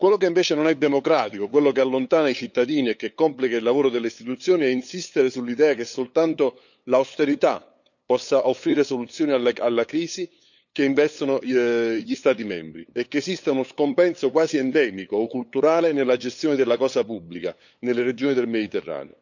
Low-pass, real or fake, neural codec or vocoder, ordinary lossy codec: 7.2 kHz; fake; codec, 16 kHz, 8 kbps, FunCodec, trained on LibriTTS, 25 frames a second; none